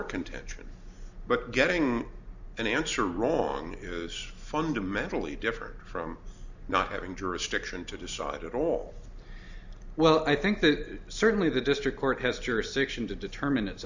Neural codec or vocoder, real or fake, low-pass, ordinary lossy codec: none; real; 7.2 kHz; Opus, 64 kbps